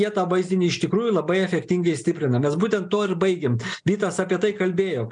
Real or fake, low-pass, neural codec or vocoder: real; 9.9 kHz; none